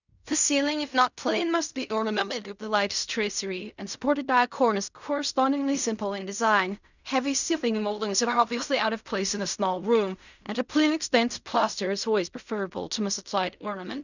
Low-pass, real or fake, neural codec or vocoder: 7.2 kHz; fake; codec, 16 kHz in and 24 kHz out, 0.4 kbps, LongCat-Audio-Codec, fine tuned four codebook decoder